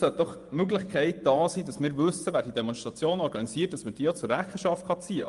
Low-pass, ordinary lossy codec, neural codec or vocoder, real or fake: 10.8 kHz; Opus, 32 kbps; vocoder, 24 kHz, 100 mel bands, Vocos; fake